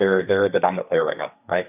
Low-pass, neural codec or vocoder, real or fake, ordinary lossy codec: 3.6 kHz; codec, 32 kHz, 1.9 kbps, SNAC; fake; AAC, 32 kbps